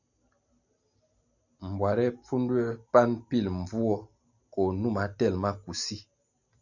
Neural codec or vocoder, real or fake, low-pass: none; real; 7.2 kHz